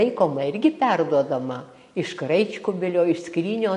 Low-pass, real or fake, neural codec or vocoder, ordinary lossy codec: 14.4 kHz; real; none; MP3, 48 kbps